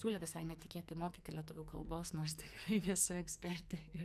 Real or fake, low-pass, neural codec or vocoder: fake; 14.4 kHz; codec, 44.1 kHz, 2.6 kbps, SNAC